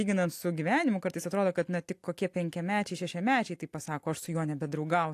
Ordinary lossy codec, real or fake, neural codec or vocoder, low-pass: AAC, 64 kbps; real; none; 14.4 kHz